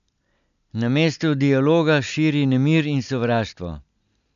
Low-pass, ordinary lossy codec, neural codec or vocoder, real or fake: 7.2 kHz; none; none; real